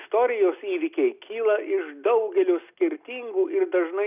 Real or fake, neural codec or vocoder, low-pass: real; none; 3.6 kHz